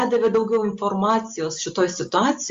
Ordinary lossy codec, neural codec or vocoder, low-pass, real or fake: Opus, 32 kbps; none; 7.2 kHz; real